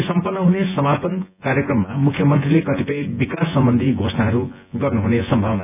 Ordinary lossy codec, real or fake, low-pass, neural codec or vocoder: none; fake; 3.6 kHz; vocoder, 24 kHz, 100 mel bands, Vocos